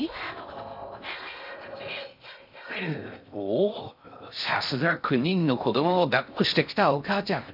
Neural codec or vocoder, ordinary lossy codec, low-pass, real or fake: codec, 16 kHz in and 24 kHz out, 0.6 kbps, FocalCodec, streaming, 4096 codes; none; 5.4 kHz; fake